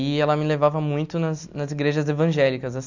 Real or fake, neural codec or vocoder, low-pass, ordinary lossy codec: real; none; 7.2 kHz; none